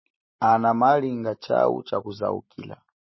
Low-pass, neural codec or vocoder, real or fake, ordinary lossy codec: 7.2 kHz; none; real; MP3, 24 kbps